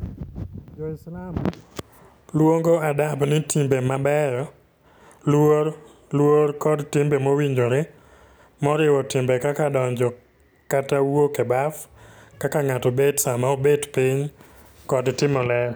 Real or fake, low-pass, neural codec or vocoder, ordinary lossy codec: real; none; none; none